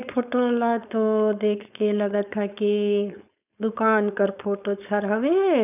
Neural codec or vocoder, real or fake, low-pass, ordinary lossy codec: codec, 16 kHz, 4.8 kbps, FACodec; fake; 3.6 kHz; none